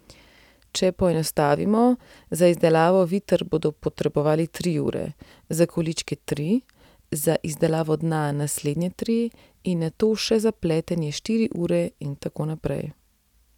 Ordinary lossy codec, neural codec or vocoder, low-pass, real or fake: none; none; 19.8 kHz; real